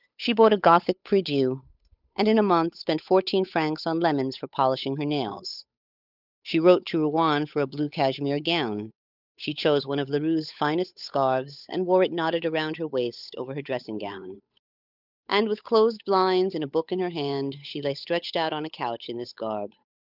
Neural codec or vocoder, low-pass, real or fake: codec, 16 kHz, 8 kbps, FunCodec, trained on Chinese and English, 25 frames a second; 5.4 kHz; fake